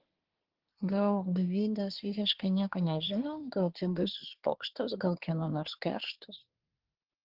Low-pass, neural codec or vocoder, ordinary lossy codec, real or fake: 5.4 kHz; codec, 24 kHz, 1 kbps, SNAC; Opus, 16 kbps; fake